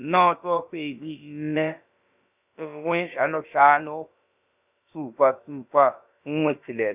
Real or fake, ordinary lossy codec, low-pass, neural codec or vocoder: fake; none; 3.6 kHz; codec, 16 kHz, about 1 kbps, DyCAST, with the encoder's durations